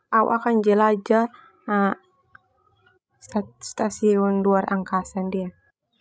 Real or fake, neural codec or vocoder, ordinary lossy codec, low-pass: fake; codec, 16 kHz, 16 kbps, FreqCodec, larger model; none; none